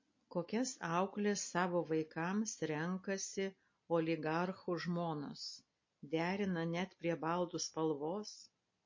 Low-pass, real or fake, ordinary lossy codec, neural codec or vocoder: 7.2 kHz; real; MP3, 32 kbps; none